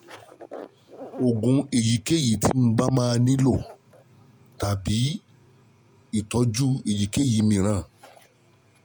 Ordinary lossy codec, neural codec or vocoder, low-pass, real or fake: none; vocoder, 48 kHz, 128 mel bands, Vocos; none; fake